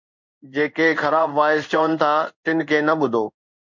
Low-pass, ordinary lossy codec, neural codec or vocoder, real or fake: 7.2 kHz; MP3, 64 kbps; codec, 16 kHz in and 24 kHz out, 1 kbps, XY-Tokenizer; fake